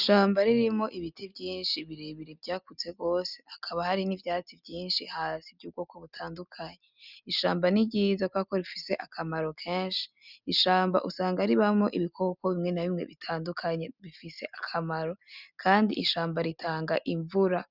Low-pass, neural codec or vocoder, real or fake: 5.4 kHz; none; real